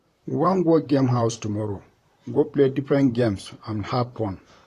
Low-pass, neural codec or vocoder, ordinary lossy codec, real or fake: 14.4 kHz; vocoder, 44.1 kHz, 128 mel bands, Pupu-Vocoder; AAC, 48 kbps; fake